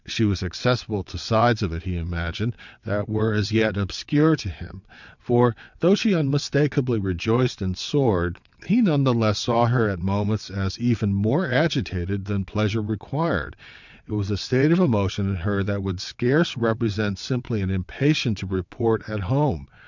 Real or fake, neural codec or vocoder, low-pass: fake; vocoder, 22.05 kHz, 80 mel bands, WaveNeXt; 7.2 kHz